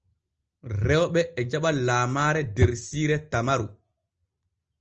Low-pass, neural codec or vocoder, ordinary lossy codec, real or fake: 7.2 kHz; none; Opus, 24 kbps; real